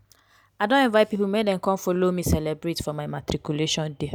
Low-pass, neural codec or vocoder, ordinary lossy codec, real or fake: none; none; none; real